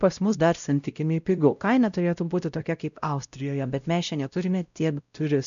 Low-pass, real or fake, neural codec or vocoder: 7.2 kHz; fake; codec, 16 kHz, 0.5 kbps, X-Codec, WavLM features, trained on Multilingual LibriSpeech